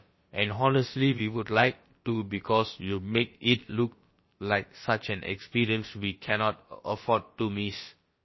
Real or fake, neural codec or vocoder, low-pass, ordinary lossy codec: fake; codec, 16 kHz, about 1 kbps, DyCAST, with the encoder's durations; 7.2 kHz; MP3, 24 kbps